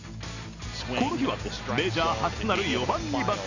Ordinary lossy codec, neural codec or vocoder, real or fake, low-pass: none; none; real; 7.2 kHz